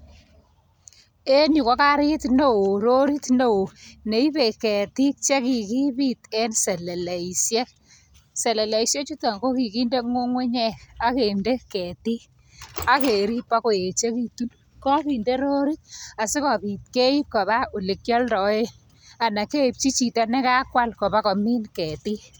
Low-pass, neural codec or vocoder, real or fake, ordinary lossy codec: none; none; real; none